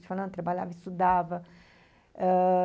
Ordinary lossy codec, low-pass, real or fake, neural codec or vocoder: none; none; real; none